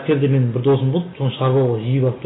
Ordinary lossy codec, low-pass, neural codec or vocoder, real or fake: AAC, 16 kbps; 7.2 kHz; none; real